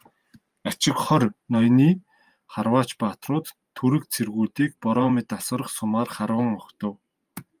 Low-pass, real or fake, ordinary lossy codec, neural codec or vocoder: 14.4 kHz; fake; Opus, 32 kbps; vocoder, 44.1 kHz, 128 mel bands every 512 samples, BigVGAN v2